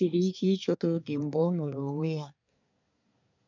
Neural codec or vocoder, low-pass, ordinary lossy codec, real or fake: codec, 24 kHz, 1 kbps, SNAC; 7.2 kHz; none; fake